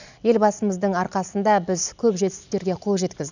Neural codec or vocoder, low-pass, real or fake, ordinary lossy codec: none; 7.2 kHz; real; none